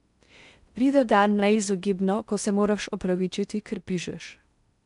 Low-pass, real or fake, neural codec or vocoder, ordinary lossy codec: 10.8 kHz; fake; codec, 16 kHz in and 24 kHz out, 0.6 kbps, FocalCodec, streaming, 4096 codes; none